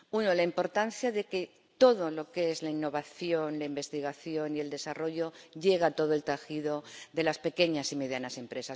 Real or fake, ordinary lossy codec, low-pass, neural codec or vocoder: real; none; none; none